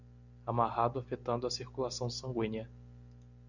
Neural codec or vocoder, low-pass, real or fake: none; 7.2 kHz; real